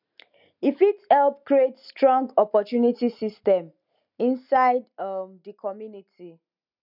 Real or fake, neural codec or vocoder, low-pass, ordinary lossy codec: real; none; 5.4 kHz; none